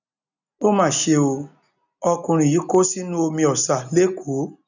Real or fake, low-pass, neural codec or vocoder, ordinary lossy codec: real; 7.2 kHz; none; none